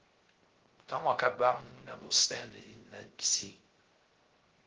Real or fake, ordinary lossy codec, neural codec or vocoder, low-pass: fake; Opus, 16 kbps; codec, 16 kHz, 0.3 kbps, FocalCodec; 7.2 kHz